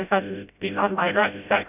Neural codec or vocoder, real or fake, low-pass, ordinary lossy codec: codec, 16 kHz, 0.5 kbps, FreqCodec, smaller model; fake; 3.6 kHz; none